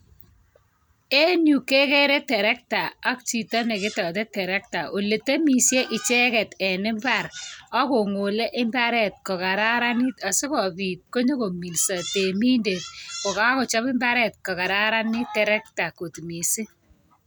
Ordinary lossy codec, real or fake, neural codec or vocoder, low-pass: none; real; none; none